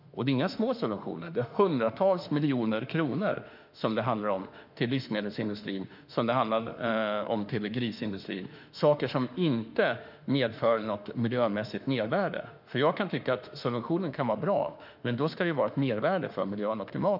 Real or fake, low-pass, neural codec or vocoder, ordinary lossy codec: fake; 5.4 kHz; autoencoder, 48 kHz, 32 numbers a frame, DAC-VAE, trained on Japanese speech; none